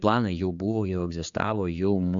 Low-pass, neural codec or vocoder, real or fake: 7.2 kHz; codec, 16 kHz, 4 kbps, X-Codec, HuBERT features, trained on general audio; fake